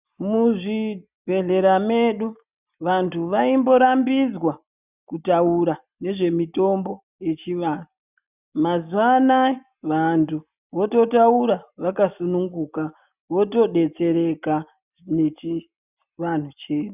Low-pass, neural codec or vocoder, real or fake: 3.6 kHz; none; real